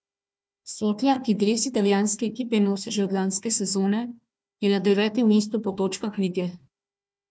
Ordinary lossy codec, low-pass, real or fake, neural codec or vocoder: none; none; fake; codec, 16 kHz, 1 kbps, FunCodec, trained on Chinese and English, 50 frames a second